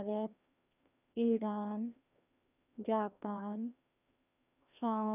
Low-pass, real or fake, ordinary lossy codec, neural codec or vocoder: 3.6 kHz; fake; none; codec, 16 kHz, 1 kbps, FreqCodec, larger model